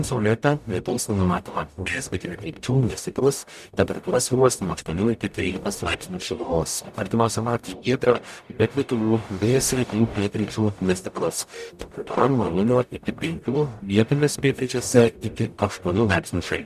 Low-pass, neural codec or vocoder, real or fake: 14.4 kHz; codec, 44.1 kHz, 0.9 kbps, DAC; fake